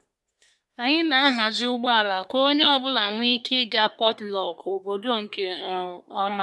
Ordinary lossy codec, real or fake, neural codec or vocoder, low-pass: none; fake; codec, 24 kHz, 1 kbps, SNAC; none